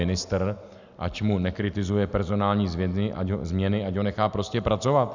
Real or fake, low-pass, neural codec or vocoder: real; 7.2 kHz; none